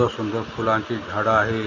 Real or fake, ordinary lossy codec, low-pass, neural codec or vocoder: real; none; 7.2 kHz; none